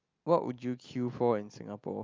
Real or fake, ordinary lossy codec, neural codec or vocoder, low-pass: real; Opus, 24 kbps; none; 7.2 kHz